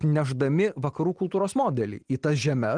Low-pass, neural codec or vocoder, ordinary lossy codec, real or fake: 9.9 kHz; none; Opus, 24 kbps; real